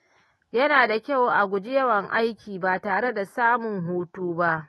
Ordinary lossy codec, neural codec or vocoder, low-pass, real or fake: AAC, 32 kbps; autoencoder, 48 kHz, 128 numbers a frame, DAC-VAE, trained on Japanese speech; 19.8 kHz; fake